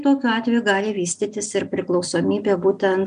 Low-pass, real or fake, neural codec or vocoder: 9.9 kHz; real; none